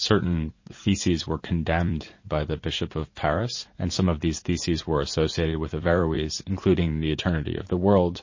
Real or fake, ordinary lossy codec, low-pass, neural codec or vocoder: fake; MP3, 32 kbps; 7.2 kHz; vocoder, 44.1 kHz, 80 mel bands, Vocos